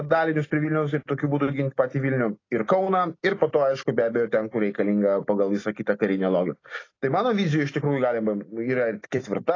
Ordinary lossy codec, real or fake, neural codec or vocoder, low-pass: AAC, 32 kbps; real; none; 7.2 kHz